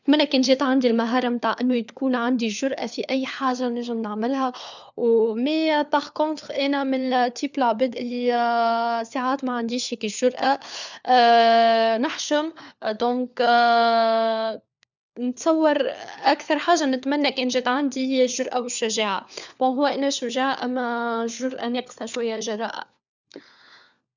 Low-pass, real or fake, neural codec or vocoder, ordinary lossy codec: 7.2 kHz; fake; codec, 16 kHz, 4 kbps, FunCodec, trained on LibriTTS, 50 frames a second; none